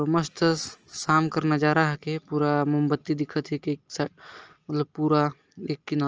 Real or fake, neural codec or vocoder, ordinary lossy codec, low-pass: real; none; Opus, 24 kbps; 7.2 kHz